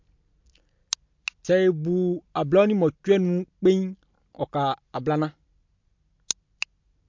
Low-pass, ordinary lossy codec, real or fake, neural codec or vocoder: 7.2 kHz; MP3, 48 kbps; real; none